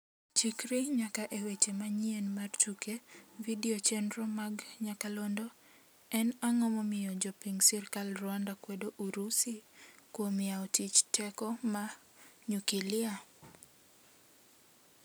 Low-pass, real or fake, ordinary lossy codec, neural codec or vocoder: none; real; none; none